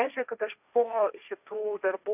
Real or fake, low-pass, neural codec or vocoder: fake; 3.6 kHz; codec, 16 kHz, 1.1 kbps, Voila-Tokenizer